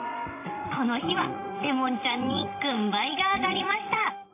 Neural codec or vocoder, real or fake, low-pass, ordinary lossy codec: codec, 16 kHz, 16 kbps, FreqCodec, larger model; fake; 3.6 kHz; AAC, 24 kbps